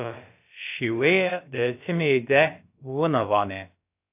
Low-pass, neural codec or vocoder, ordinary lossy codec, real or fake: 3.6 kHz; codec, 16 kHz, about 1 kbps, DyCAST, with the encoder's durations; AAC, 32 kbps; fake